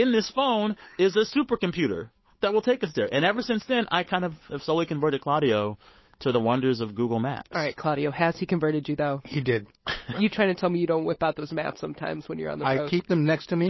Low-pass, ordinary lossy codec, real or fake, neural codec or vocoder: 7.2 kHz; MP3, 24 kbps; fake; codec, 16 kHz, 8 kbps, FunCodec, trained on Chinese and English, 25 frames a second